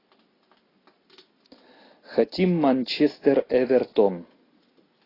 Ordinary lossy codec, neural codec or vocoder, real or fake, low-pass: AAC, 24 kbps; none; real; 5.4 kHz